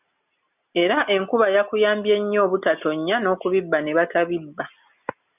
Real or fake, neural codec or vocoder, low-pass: real; none; 3.6 kHz